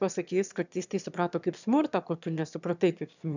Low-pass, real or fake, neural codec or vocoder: 7.2 kHz; fake; autoencoder, 22.05 kHz, a latent of 192 numbers a frame, VITS, trained on one speaker